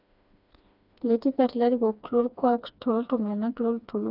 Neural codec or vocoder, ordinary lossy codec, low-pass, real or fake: codec, 16 kHz, 2 kbps, FreqCodec, smaller model; none; 5.4 kHz; fake